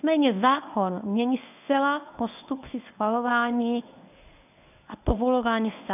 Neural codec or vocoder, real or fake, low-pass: codec, 24 kHz, 0.9 kbps, WavTokenizer, medium speech release version 1; fake; 3.6 kHz